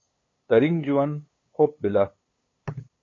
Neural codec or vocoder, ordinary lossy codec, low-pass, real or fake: codec, 16 kHz, 8 kbps, FunCodec, trained on LibriTTS, 25 frames a second; AAC, 32 kbps; 7.2 kHz; fake